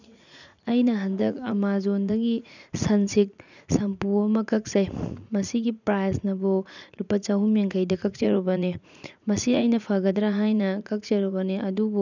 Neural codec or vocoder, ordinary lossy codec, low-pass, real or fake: vocoder, 44.1 kHz, 80 mel bands, Vocos; none; 7.2 kHz; fake